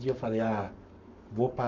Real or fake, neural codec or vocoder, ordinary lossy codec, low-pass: fake; codec, 44.1 kHz, 7.8 kbps, Pupu-Codec; none; 7.2 kHz